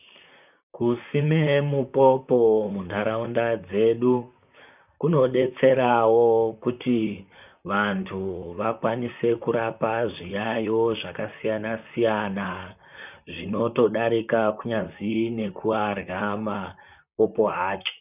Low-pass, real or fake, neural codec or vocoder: 3.6 kHz; fake; vocoder, 44.1 kHz, 128 mel bands, Pupu-Vocoder